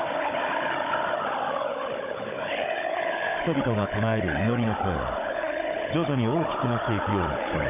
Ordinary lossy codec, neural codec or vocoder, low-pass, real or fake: none; codec, 16 kHz, 16 kbps, FunCodec, trained on Chinese and English, 50 frames a second; 3.6 kHz; fake